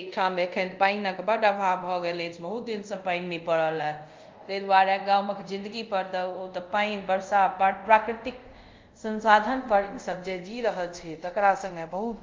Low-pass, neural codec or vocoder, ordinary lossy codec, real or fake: 7.2 kHz; codec, 24 kHz, 0.5 kbps, DualCodec; Opus, 24 kbps; fake